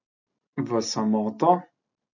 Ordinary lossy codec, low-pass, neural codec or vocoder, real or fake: none; 7.2 kHz; none; real